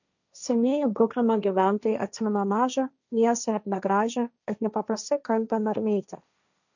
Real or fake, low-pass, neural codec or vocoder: fake; 7.2 kHz; codec, 16 kHz, 1.1 kbps, Voila-Tokenizer